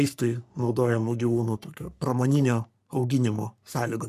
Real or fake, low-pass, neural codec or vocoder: fake; 14.4 kHz; codec, 44.1 kHz, 3.4 kbps, Pupu-Codec